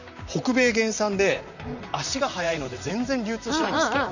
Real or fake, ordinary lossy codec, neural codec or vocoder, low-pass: fake; none; vocoder, 44.1 kHz, 128 mel bands, Pupu-Vocoder; 7.2 kHz